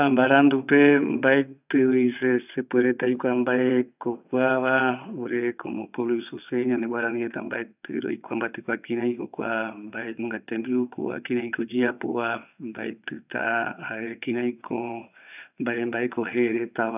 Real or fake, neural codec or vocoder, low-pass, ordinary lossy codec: fake; vocoder, 22.05 kHz, 80 mel bands, WaveNeXt; 3.6 kHz; none